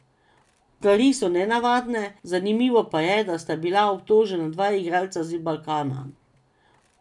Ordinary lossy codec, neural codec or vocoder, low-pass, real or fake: none; none; 10.8 kHz; real